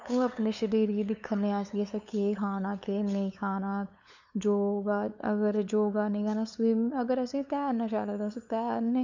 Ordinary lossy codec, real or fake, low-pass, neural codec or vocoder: none; fake; 7.2 kHz; codec, 16 kHz, 8 kbps, FunCodec, trained on LibriTTS, 25 frames a second